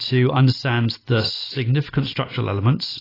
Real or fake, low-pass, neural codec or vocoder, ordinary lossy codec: real; 5.4 kHz; none; AAC, 24 kbps